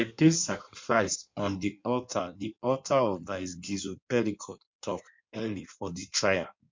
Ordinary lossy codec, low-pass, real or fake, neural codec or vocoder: MP3, 64 kbps; 7.2 kHz; fake; codec, 16 kHz in and 24 kHz out, 1.1 kbps, FireRedTTS-2 codec